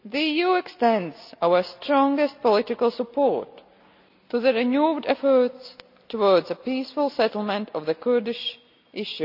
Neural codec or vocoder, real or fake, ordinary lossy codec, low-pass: none; real; none; 5.4 kHz